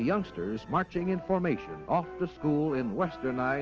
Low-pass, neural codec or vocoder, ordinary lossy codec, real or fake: 7.2 kHz; none; Opus, 24 kbps; real